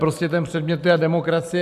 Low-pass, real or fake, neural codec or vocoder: 14.4 kHz; real; none